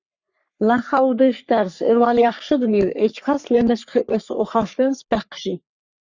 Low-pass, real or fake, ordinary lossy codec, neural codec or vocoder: 7.2 kHz; fake; Opus, 64 kbps; codec, 44.1 kHz, 3.4 kbps, Pupu-Codec